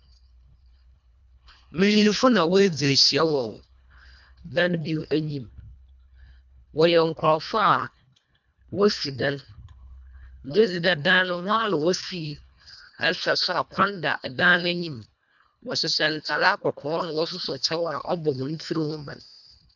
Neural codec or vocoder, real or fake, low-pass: codec, 24 kHz, 1.5 kbps, HILCodec; fake; 7.2 kHz